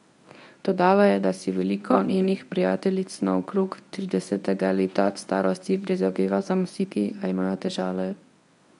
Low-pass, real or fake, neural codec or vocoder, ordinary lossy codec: 10.8 kHz; fake; codec, 24 kHz, 0.9 kbps, WavTokenizer, medium speech release version 1; none